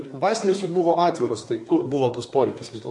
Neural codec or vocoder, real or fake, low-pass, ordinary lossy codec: codec, 24 kHz, 1 kbps, SNAC; fake; 10.8 kHz; MP3, 48 kbps